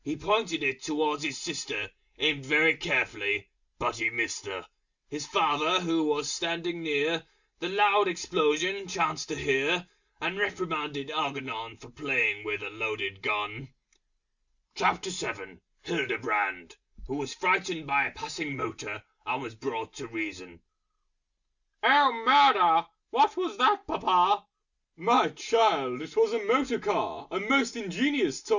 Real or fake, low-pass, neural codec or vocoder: real; 7.2 kHz; none